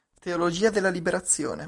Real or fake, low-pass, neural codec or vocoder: real; 10.8 kHz; none